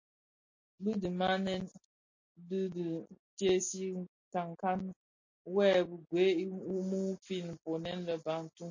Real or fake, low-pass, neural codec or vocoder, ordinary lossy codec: real; 7.2 kHz; none; MP3, 32 kbps